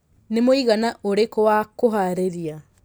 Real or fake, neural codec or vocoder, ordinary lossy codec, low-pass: real; none; none; none